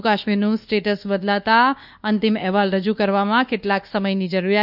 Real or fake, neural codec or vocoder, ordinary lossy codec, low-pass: fake; codec, 24 kHz, 1.2 kbps, DualCodec; none; 5.4 kHz